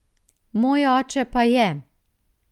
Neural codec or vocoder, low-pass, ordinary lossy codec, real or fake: none; 19.8 kHz; Opus, 32 kbps; real